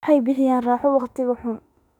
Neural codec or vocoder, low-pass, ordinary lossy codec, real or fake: autoencoder, 48 kHz, 32 numbers a frame, DAC-VAE, trained on Japanese speech; 19.8 kHz; none; fake